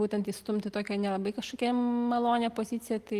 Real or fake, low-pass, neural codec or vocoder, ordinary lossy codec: real; 14.4 kHz; none; Opus, 24 kbps